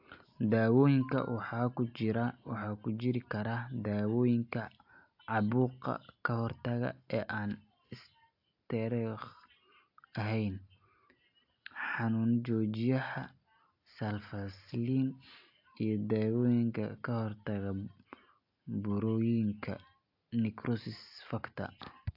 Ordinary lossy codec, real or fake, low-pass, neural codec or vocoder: AAC, 48 kbps; real; 5.4 kHz; none